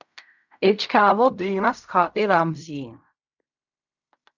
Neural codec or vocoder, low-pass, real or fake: codec, 16 kHz in and 24 kHz out, 0.4 kbps, LongCat-Audio-Codec, fine tuned four codebook decoder; 7.2 kHz; fake